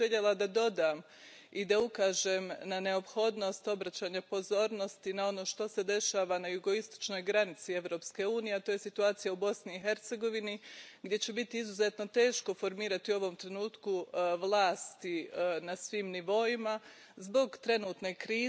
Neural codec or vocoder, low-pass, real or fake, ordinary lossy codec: none; none; real; none